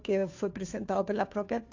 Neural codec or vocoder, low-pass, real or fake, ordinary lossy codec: codec, 16 kHz, 2 kbps, FunCodec, trained on Chinese and English, 25 frames a second; 7.2 kHz; fake; AAC, 48 kbps